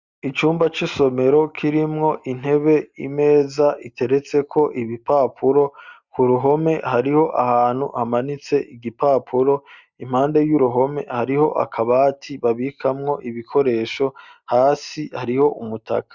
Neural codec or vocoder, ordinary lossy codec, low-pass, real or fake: none; Opus, 64 kbps; 7.2 kHz; real